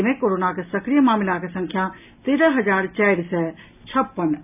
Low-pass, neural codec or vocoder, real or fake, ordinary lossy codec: 3.6 kHz; none; real; none